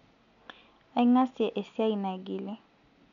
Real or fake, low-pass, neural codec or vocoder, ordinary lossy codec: real; 7.2 kHz; none; none